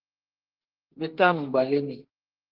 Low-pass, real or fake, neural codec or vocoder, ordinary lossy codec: 5.4 kHz; fake; codec, 32 kHz, 1.9 kbps, SNAC; Opus, 16 kbps